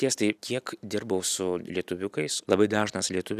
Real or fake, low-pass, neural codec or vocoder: real; 14.4 kHz; none